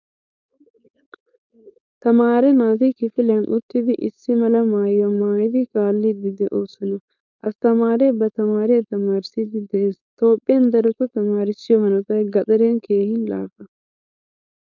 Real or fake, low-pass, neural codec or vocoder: fake; 7.2 kHz; codec, 16 kHz, 4.8 kbps, FACodec